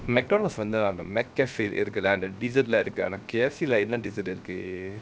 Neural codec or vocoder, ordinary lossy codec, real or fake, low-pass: codec, 16 kHz, 0.7 kbps, FocalCodec; none; fake; none